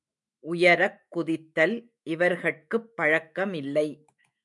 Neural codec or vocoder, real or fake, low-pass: autoencoder, 48 kHz, 128 numbers a frame, DAC-VAE, trained on Japanese speech; fake; 10.8 kHz